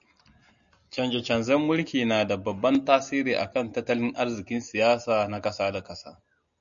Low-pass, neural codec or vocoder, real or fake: 7.2 kHz; none; real